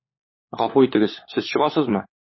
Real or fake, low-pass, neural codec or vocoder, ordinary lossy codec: fake; 7.2 kHz; codec, 16 kHz, 16 kbps, FunCodec, trained on LibriTTS, 50 frames a second; MP3, 24 kbps